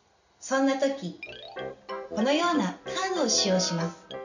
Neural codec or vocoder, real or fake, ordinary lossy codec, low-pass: none; real; none; 7.2 kHz